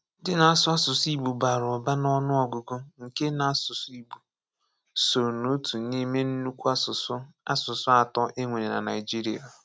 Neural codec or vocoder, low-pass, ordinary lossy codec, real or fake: none; none; none; real